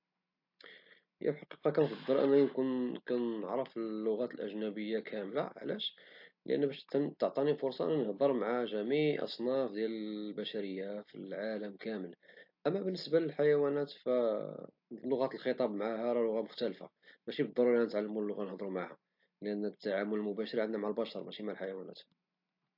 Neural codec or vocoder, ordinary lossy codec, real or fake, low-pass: none; AAC, 48 kbps; real; 5.4 kHz